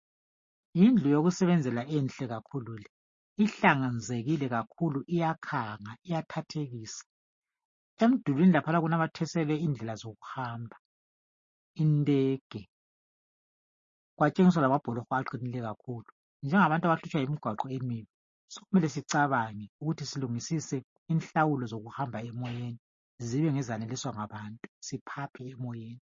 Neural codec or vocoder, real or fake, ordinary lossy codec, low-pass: none; real; MP3, 32 kbps; 7.2 kHz